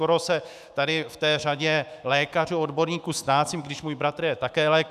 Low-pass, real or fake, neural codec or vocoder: 14.4 kHz; fake; autoencoder, 48 kHz, 128 numbers a frame, DAC-VAE, trained on Japanese speech